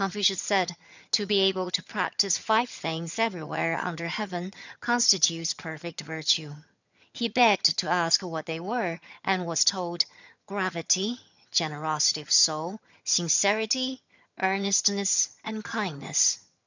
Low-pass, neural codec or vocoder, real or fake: 7.2 kHz; vocoder, 22.05 kHz, 80 mel bands, HiFi-GAN; fake